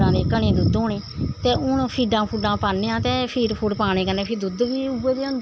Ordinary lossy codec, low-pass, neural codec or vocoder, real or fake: none; none; none; real